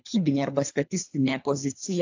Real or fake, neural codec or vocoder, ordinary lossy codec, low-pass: fake; codec, 24 kHz, 3 kbps, HILCodec; AAC, 48 kbps; 7.2 kHz